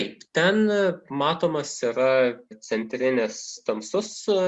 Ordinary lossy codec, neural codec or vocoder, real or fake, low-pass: Opus, 64 kbps; none; real; 10.8 kHz